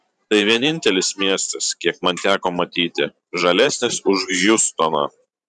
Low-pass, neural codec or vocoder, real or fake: 10.8 kHz; vocoder, 44.1 kHz, 128 mel bands every 512 samples, BigVGAN v2; fake